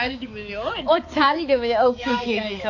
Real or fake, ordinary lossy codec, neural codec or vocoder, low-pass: fake; AAC, 48 kbps; codec, 16 kHz, 4 kbps, X-Codec, HuBERT features, trained on balanced general audio; 7.2 kHz